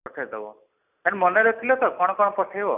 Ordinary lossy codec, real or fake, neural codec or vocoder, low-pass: none; real; none; 3.6 kHz